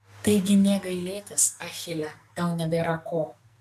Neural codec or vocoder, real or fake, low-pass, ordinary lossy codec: codec, 44.1 kHz, 2.6 kbps, SNAC; fake; 14.4 kHz; MP3, 96 kbps